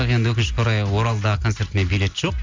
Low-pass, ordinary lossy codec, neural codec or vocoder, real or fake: 7.2 kHz; MP3, 64 kbps; none; real